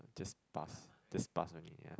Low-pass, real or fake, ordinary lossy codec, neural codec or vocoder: none; real; none; none